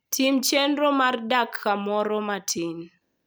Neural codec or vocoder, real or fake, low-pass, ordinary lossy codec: none; real; none; none